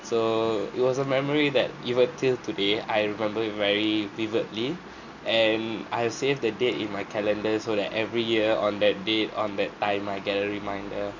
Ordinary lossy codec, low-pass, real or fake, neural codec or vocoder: none; 7.2 kHz; real; none